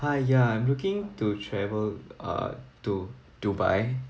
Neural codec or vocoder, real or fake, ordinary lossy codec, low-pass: none; real; none; none